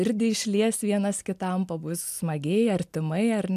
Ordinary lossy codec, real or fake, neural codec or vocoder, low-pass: MP3, 96 kbps; real; none; 14.4 kHz